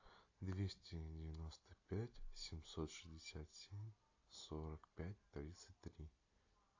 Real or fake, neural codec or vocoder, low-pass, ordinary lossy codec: real; none; 7.2 kHz; AAC, 48 kbps